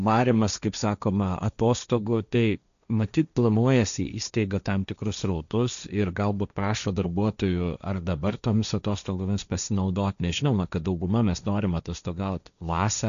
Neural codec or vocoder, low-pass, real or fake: codec, 16 kHz, 1.1 kbps, Voila-Tokenizer; 7.2 kHz; fake